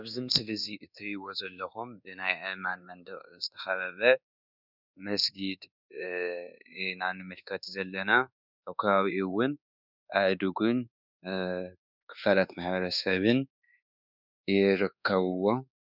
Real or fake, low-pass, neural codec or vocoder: fake; 5.4 kHz; codec, 24 kHz, 1.2 kbps, DualCodec